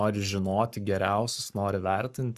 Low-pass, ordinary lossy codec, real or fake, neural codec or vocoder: 14.4 kHz; Opus, 64 kbps; fake; codec, 44.1 kHz, 7.8 kbps, Pupu-Codec